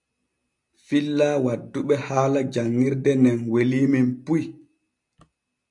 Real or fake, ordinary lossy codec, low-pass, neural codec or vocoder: real; AAC, 64 kbps; 10.8 kHz; none